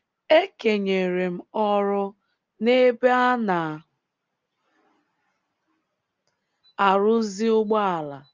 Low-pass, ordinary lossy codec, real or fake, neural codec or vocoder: 7.2 kHz; Opus, 32 kbps; real; none